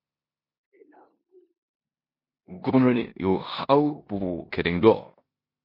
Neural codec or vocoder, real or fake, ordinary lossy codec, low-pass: codec, 16 kHz in and 24 kHz out, 0.9 kbps, LongCat-Audio-Codec, four codebook decoder; fake; MP3, 32 kbps; 5.4 kHz